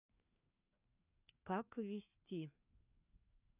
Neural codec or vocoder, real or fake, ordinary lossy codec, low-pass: codec, 16 kHz, 2 kbps, FreqCodec, larger model; fake; none; 3.6 kHz